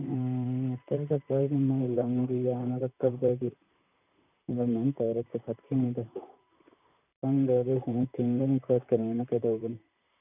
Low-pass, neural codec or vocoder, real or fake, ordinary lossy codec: 3.6 kHz; vocoder, 44.1 kHz, 128 mel bands, Pupu-Vocoder; fake; none